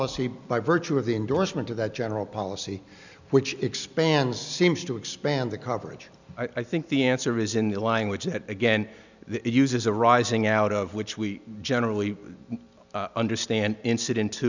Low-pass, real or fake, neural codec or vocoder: 7.2 kHz; real; none